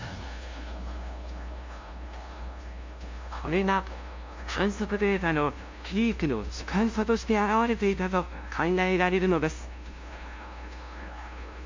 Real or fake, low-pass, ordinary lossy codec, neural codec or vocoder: fake; 7.2 kHz; MP3, 48 kbps; codec, 16 kHz, 0.5 kbps, FunCodec, trained on LibriTTS, 25 frames a second